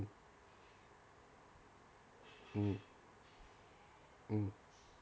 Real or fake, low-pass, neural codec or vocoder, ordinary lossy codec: real; none; none; none